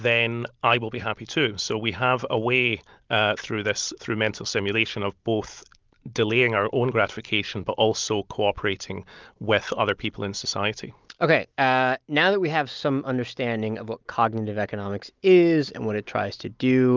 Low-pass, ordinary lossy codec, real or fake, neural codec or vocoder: 7.2 kHz; Opus, 24 kbps; real; none